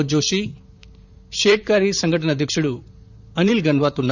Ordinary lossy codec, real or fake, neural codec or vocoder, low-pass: none; fake; vocoder, 44.1 kHz, 128 mel bands, Pupu-Vocoder; 7.2 kHz